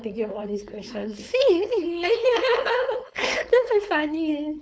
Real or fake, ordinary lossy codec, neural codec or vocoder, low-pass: fake; none; codec, 16 kHz, 4.8 kbps, FACodec; none